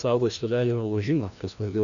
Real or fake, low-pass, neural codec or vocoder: fake; 7.2 kHz; codec, 16 kHz, 1 kbps, FreqCodec, larger model